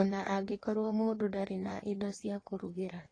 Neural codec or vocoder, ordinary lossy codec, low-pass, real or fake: codec, 16 kHz in and 24 kHz out, 1.1 kbps, FireRedTTS-2 codec; AAC, 32 kbps; 9.9 kHz; fake